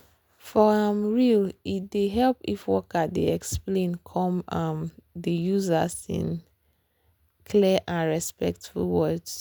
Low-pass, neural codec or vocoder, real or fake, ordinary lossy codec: none; none; real; none